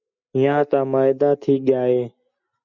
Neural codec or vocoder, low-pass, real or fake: none; 7.2 kHz; real